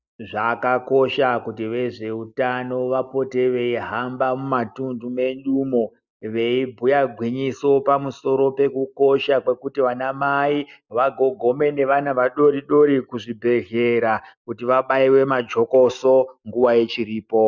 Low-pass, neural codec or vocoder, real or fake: 7.2 kHz; none; real